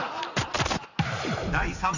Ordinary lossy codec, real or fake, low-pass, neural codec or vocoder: none; real; 7.2 kHz; none